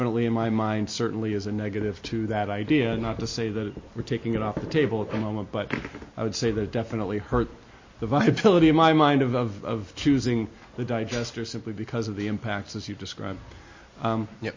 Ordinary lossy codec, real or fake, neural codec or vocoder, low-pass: MP3, 32 kbps; real; none; 7.2 kHz